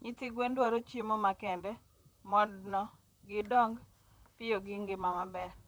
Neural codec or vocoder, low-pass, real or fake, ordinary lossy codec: vocoder, 44.1 kHz, 128 mel bands, Pupu-Vocoder; none; fake; none